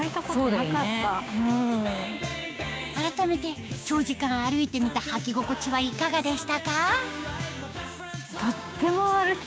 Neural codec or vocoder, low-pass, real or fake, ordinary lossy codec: codec, 16 kHz, 6 kbps, DAC; none; fake; none